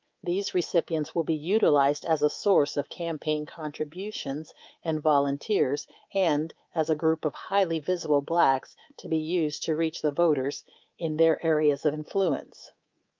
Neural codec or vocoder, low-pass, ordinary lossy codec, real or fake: codec, 24 kHz, 3.1 kbps, DualCodec; 7.2 kHz; Opus, 24 kbps; fake